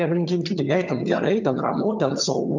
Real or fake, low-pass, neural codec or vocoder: fake; 7.2 kHz; vocoder, 22.05 kHz, 80 mel bands, HiFi-GAN